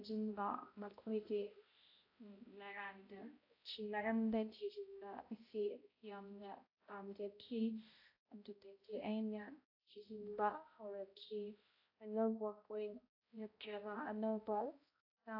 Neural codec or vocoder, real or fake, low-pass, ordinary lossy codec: codec, 16 kHz, 0.5 kbps, X-Codec, HuBERT features, trained on balanced general audio; fake; 5.4 kHz; AAC, 32 kbps